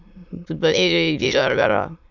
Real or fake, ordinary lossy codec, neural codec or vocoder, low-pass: fake; Opus, 64 kbps; autoencoder, 22.05 kHz, a latent of 192 numbers a frame, VITS, trained on many speakers; 7.2 kHz